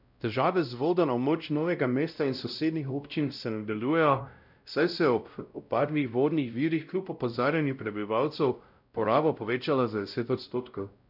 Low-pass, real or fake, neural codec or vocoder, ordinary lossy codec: 5.4 kHz; fake; codec, 16 kHz, 0.5 kbps, X-Codec, WavLM features, trained on Multilingual LibriSpeech; none